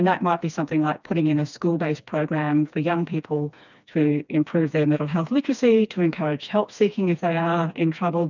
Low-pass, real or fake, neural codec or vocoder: 7.2 kHz; fake; codec, 16 kHz, 2 kbps, FreqCodec, smaller model